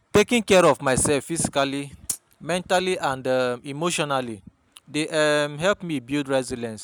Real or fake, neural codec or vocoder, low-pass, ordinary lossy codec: real; none; none; none